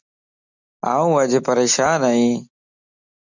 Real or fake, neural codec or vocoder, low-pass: real; none; 7.2 kHz